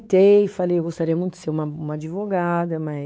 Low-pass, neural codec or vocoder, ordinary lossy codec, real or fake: none; codec, 16 kHz, 4 kbps, X-Codec, WavLM features, trained on Multilingual LibriSpeech; none; fake